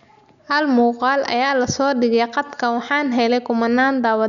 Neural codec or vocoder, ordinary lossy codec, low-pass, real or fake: none; none; 7.2 kHz; real